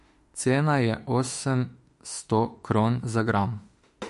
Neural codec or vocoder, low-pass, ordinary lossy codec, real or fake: autoencoder, 48 kHz, 32 numbers a frame, DAC-VAE, trained on Japanese speech; 14.4 kHz; MP3, 48 kbps; fake